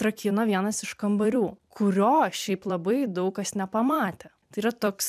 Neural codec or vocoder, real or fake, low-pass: vocoder, 44.1 kHz, 128 mel bands every 256 samples, BigVGAN v2; fake; 14.4 kHz